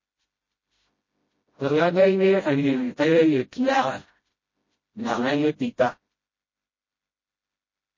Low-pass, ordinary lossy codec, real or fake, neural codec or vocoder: 7.2 kHz; MP3, 32 kbps; fake; codec, 16 kHz, 0.5 kbps, FreqCodec, smaller model